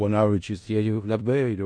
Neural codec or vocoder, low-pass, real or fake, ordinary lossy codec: codec, 16 kHz in and 24 kHz out, 0.4 kbps, LongCat-Audio-Codec, four codebook decoder; 9.9 kHz; fake; MP3, 48 kbps